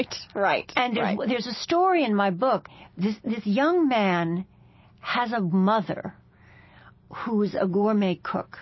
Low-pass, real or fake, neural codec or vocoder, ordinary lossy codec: 7.2 kHz; real; none; MP3, 24 kbps